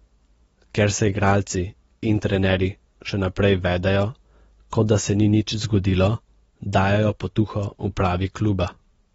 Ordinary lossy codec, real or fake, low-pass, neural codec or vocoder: AAC, 24 kbps; real; 19.8 kHz; none